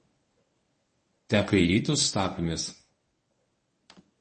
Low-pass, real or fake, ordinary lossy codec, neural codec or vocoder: 10.8 kHz; fake; MP3, 32 kbps; codec, 24 kHz, 0.9 kbps, WavTokenizer, medium speech release version 1